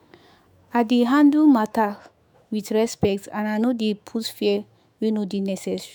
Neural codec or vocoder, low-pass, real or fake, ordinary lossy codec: autoencoder, 48 kHz, 128 numbers a frame, DAC-VAE, trained on Japanese speech; none; fake; none